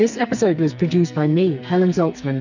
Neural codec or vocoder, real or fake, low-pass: codec, 44.1 kHz, 2.6 kbps, SNAC; fake; 7.2 kHz